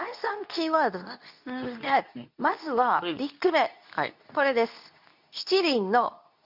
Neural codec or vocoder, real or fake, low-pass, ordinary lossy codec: codec, 24 kHz, 0.9 kbps, WavTokenizer, medium speech release version 2; fake; 5.4 kHz; none